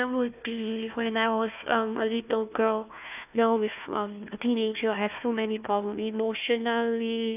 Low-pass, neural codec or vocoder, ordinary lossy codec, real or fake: 3.6 kHz; codec, 16 kHz, 1 kbps, FunCodec, trained on Chinese and English, 50 frames a second; none; fake